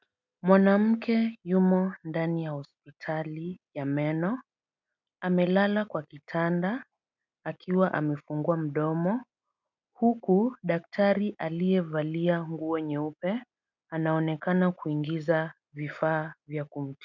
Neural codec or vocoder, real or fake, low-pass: none; real; 7.2 kHz